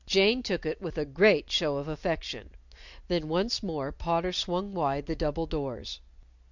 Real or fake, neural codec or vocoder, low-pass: real; none; 7.2 kHz